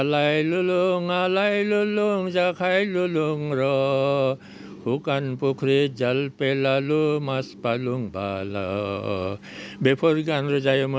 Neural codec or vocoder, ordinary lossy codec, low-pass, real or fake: none; none; none; real